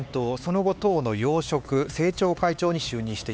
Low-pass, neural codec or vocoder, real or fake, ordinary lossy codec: none; codec, 16 kHz, 4 kbps, X-Codec, HuBERT features, trained on LibriSpeech; fake; none